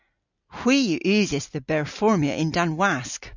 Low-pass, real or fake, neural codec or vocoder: 7.2 kHz; real; none